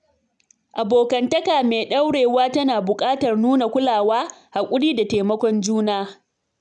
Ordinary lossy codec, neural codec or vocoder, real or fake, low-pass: none; none; real; 9.9 kHz